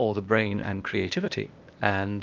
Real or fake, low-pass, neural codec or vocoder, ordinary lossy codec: fake; 7.2 kHz; codec, 16 kHz, 0.8 kbps, ZipCodec; Opus, 32 kbps